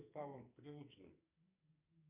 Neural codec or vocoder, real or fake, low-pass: codec, 44.1 kHz, 2.6 kbps, SNAC; fake; 3.6 kHz